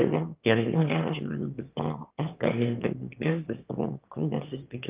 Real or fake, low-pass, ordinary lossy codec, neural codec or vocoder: fake; 3.6 kHz; Opus, 24 kbps; autoencoder, 22.05 kHz, a latent of 192 numbers a frame, VITS, trained on one speaker